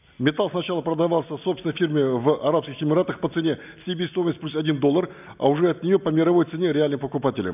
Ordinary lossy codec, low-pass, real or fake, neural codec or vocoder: none; 3.6 kHz; real; none